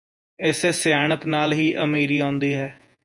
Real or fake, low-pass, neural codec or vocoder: fake; 10.8 kHz; vocoder, 48 kHz, 128 mel bands, Vocos